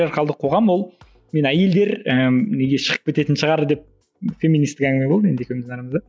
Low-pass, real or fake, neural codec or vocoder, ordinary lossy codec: none; real; none; none